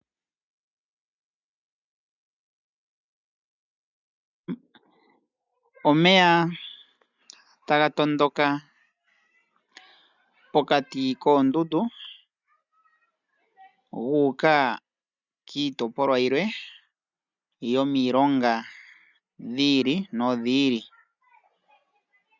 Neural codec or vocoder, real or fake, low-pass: none; real; 7.2 kHz